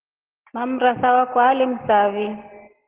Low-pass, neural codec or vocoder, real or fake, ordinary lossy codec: 3.6 kHz; none; real; Opus, 16 kbps